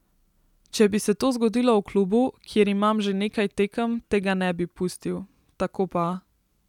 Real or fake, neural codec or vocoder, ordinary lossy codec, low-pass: real; none; none; 19.8 kHz